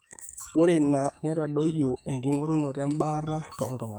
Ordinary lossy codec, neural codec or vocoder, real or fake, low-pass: none; codec, 44.1 kHz, 2.6 kbps, SNAC; fake; none